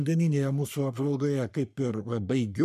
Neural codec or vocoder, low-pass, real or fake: codec, 44.1 kHz, 3.4 kbps, Pupu-Codec; 14.4 kHz; fake